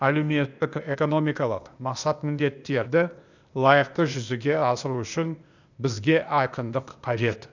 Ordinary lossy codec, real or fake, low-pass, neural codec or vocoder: none; fake; 7.2 kHz; codec, 16 kHz, 0.8 kbps, ZipCodec